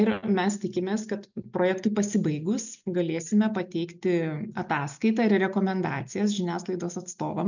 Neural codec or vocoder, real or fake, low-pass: none; real; 7.2 kHz